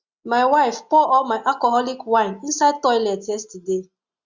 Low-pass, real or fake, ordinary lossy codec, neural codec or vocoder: 7.2 kHz; real; Opus, 64 kbps; none